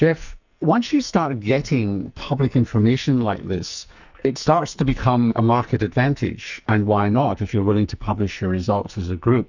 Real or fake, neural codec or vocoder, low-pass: fake; codec, 44.1 kHz, 2.6 kbps, SNAC; 7.2 kHz